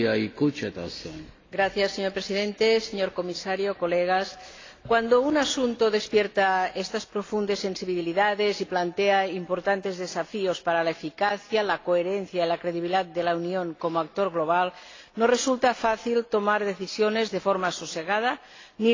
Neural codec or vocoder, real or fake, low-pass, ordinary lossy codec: none; real; 7.2 kHz; AAC, 32 kbps